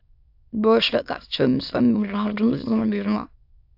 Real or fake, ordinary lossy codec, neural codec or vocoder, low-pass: fake; AAC, 48 kbps; autoencoder, 22.05 kHz, a latent of 192 numbers a frame, VITS, trained on many speakers; 5.4 kHz